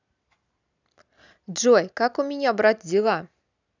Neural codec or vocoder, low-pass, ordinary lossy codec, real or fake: none; 7.2 kHz; none; real